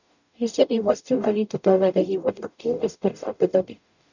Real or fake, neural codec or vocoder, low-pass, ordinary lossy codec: fake; codec, 44.1 kHz, 0.9 kbps, DAC; 7.2 kHz; none